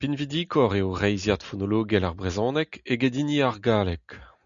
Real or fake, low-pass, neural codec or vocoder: real; 7.2 kHz; none